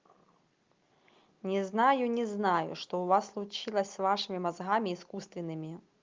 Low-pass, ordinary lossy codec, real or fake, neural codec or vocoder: 7.2 kHz; Opus, 32 kbps; real; none